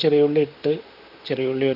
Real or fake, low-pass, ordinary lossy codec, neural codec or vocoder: fake; 5.4 kHz; none; vocoder, 44.1 kHz, 128 mel bands, Pupu-Vocoder